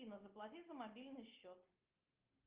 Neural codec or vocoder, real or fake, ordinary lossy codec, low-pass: none; real; Opus, 24 kbps; 3.6 kHz